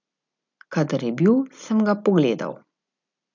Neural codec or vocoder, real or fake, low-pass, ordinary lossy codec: none; real; 7.2 kHz; none